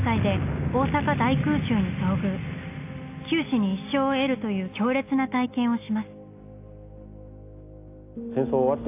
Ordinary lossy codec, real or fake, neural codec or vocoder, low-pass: AAC, 24 kbps; real; none; 3.6 kHz